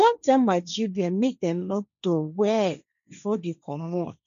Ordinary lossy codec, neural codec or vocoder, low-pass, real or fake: none; codec, 16 kHz, 1.1 kbps, Voila-Tokenizer; 7.2 kHz; fake